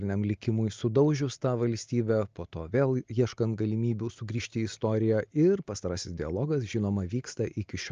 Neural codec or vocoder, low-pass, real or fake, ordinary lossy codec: none; 7.2 kHz; real; Opus, 24 kbps